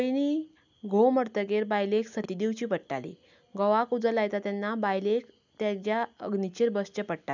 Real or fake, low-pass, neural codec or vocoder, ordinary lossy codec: real; 7.2 kHz; none; none